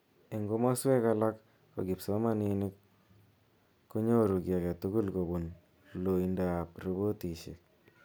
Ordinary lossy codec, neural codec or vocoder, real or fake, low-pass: none; none; real; none